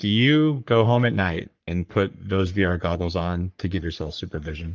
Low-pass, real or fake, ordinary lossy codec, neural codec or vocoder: 7.2 kHz; fake; Opus, 24 kbps; codec, 44.1 kHz, 3.4 kbps, Pupu-Codec